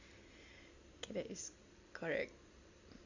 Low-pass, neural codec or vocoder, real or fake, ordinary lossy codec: 7.2 kHz; none; real; Opus, 64 kbps